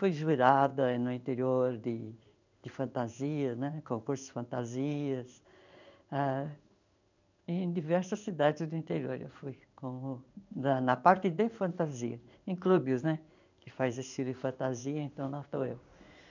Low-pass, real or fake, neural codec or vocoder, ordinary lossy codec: 7.2 kHz; fake; codec, 16 kHz in and 24 kHz out, 1 kbps, XY-Tokenizer; none